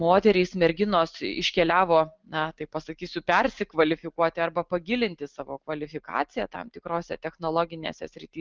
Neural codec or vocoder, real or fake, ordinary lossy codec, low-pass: none; real; Opus, 24 kbps; 7.2 kHz